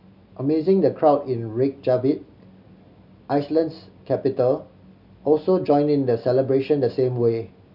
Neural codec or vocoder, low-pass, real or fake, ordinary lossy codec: none; 5.4 kHz; real; none